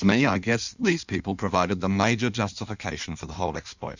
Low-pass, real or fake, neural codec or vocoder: 7.2 kHz; fake; codec, 16 kHz in and 24 kHz out, 1.1 kbps, FireRedTTS-2 codec